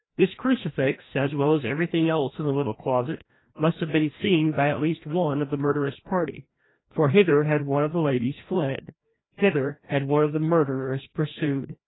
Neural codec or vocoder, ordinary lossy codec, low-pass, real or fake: codec, 16 kHz, 1 kbps, FreqCodec, larger model; AAC, 16 kbps; 7.2 kHz; fake